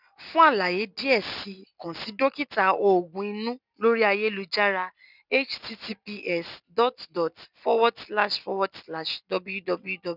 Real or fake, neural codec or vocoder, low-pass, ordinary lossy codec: real; none; 5.4 kHz; none